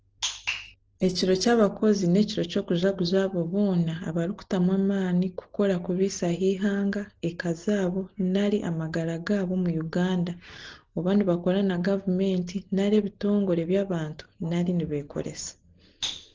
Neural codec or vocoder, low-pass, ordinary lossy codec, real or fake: none; 7.2 kHz; Opus, 16 kbps; real